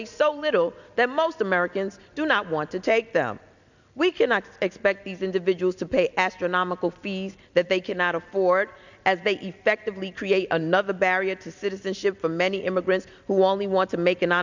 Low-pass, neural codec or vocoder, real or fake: 7.2 kHz; none; real